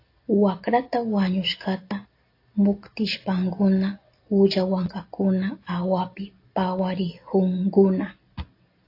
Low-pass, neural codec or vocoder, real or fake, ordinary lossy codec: 5.4 kHz; none; real; AAC, 32 kbps